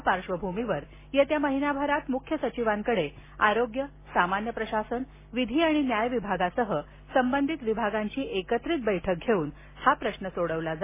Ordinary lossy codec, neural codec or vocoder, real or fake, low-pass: MP3, 16 kbps; none; real; 3.6 kHz